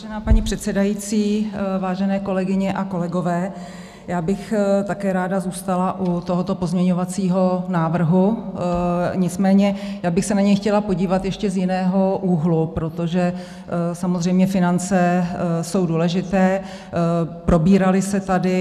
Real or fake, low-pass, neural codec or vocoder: real; 14.4 kHz; none